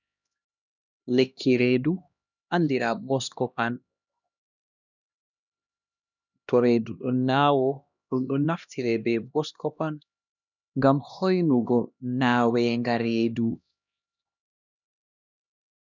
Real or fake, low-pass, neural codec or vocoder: fake; 7.2 kHz; codec, 16 kHz, 1 kbps, X-Codec, HuBERT features, trained on LibriSpeech